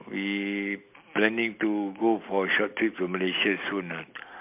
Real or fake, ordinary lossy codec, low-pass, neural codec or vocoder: real; MP3, 32 kbps; 3.6 kHz; none